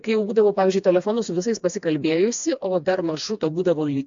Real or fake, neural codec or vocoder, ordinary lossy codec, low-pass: fake; codec, 16 kHz, 2 kbps, FreqCodec, smaller model; AAC, 64 kbps; 7.2 kHz